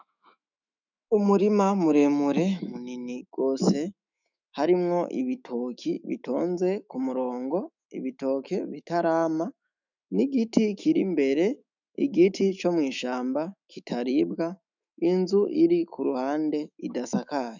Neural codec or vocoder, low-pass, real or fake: autoencoder, 48 kHz, 128 numbers a frame, DAC-VAE, trained on Japanese speech; 7.2 kHz; fake